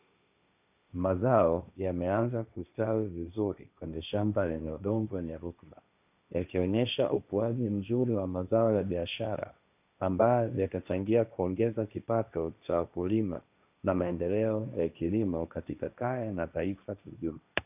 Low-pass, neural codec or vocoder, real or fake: 3.6 kHz; codec, 16 kHz, 1.1 kbps, Voila-Tokenizer; fake